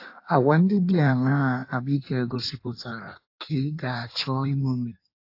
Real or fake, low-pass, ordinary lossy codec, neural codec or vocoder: fake; 5.4 kHz; AAC, 32 kbps; codec, 16 kHz in and 24 kHz out, 1.1 kbps, FireRedTTS-2 codec